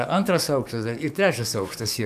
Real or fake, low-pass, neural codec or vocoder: fake; 14.4 kHz; codec, 44.1 kHz, 7.8 kbps, DAC